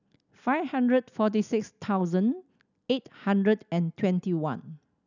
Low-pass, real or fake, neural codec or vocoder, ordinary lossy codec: 7.2 kHz; real; none; none